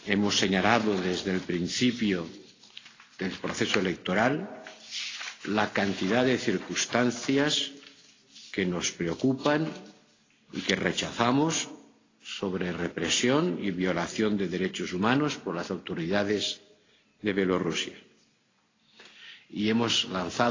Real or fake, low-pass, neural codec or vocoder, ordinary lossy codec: real; 7.2 kHz; none; AAC, 32 kbps